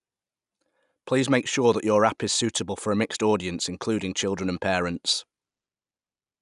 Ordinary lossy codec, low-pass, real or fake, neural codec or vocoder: none; 10.8 kHz; real; none